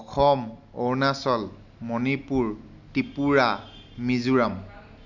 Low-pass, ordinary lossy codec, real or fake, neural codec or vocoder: 7.2 kHz; none; real; none